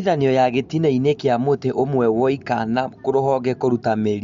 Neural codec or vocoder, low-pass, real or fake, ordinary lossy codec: none; 7.2 kHz; real; MP3, 48 kbps